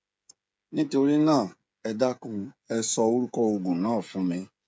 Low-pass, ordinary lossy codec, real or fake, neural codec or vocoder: none; none; fake; codec, 16 kHz, 16 kbps, FreqCodec, smaller model